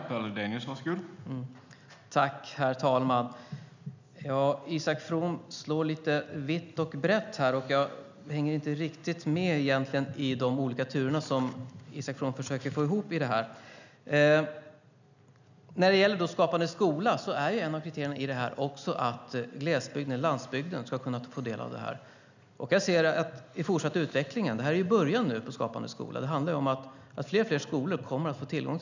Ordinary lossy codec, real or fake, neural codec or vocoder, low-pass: none; real; none; 7.2 kHz